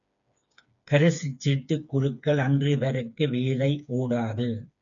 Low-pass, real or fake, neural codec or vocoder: 7.2 kHz; fake; codec, 16 kHz, 4 kbps, FreqCodec, smaller model